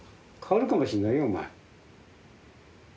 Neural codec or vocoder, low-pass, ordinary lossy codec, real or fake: none; none; none; real